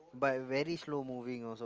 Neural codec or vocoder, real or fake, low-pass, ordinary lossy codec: none; real; 7.2 kHz; Opus, 24 kbps